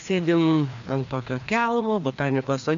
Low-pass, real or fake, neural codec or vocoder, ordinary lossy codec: 7.2 kHz; fake; codec, 16 kHz, 2 kbps, FreqCodec, larger model; MP3, 48 kbps